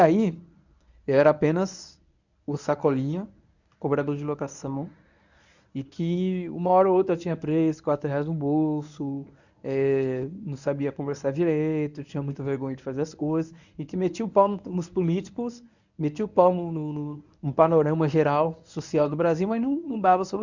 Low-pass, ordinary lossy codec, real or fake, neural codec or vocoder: 7.2 kHz; none; fake; codec, 24 kHz, 0.9 kbps, WavTokenizer, medium speech release version 1